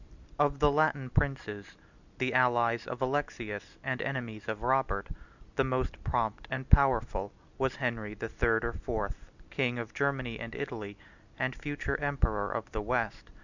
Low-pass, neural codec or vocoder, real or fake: 7.2 kHz; none; real